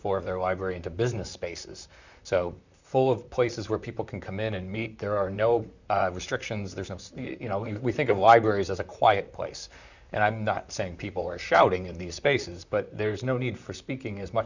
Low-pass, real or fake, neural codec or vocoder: 7.2 kHz; fake; vocoder, 44.1 kHz, 128 mel bands, Pupu-Vocoder